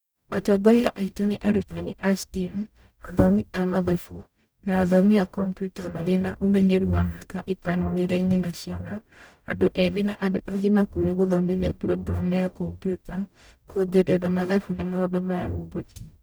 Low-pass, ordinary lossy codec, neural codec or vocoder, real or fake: none; none; codec, 44.1 kHz, 0.9 kbps, DAC; fake